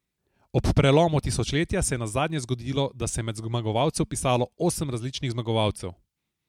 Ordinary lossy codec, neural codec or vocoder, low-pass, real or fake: MP3, 96 kbps; none; 19.8 kHz; real